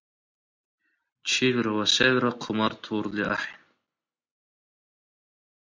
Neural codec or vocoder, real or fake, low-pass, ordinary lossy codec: none; real; 7.2 kHz; MP3, 48 kbps